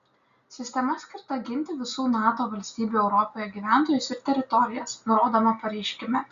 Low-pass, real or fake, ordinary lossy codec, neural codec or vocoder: 7.2 kHz; real; Opus, 64 kbps; none